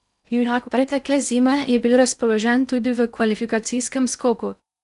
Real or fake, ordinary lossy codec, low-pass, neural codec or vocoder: fake; none; 10.8 kHz; codec, 16 kHz in and 24 kHz out, 0.6 kbps, FocalCodec, streaming, 2048 codes